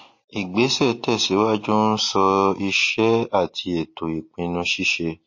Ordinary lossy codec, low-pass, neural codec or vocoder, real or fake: MP3, 32 kbps; 7.2 kHz; none; real